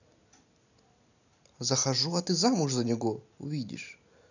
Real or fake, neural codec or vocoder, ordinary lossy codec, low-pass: real; none; none; 7.2 kHz